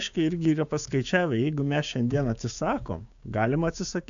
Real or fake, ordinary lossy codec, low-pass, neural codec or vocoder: fake; AAC, 64 kbps; 7.2 kHz; codec, 16 kHz, 6 kbps, DAC